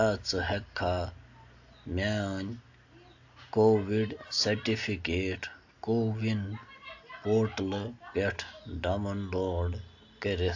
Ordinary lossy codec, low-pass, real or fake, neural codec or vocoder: AAC, 48 kbps; 7.2 kHz; real; none